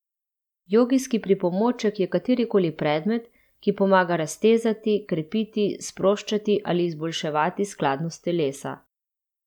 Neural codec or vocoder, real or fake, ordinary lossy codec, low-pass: none; real; none; 19.8 kHz